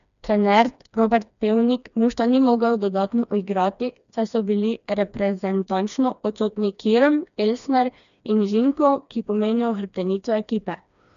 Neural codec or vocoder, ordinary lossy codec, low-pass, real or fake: codec, 16 kHz, 2 kbps, FreqCodec, smaller model; none; 7.2 kHz; fake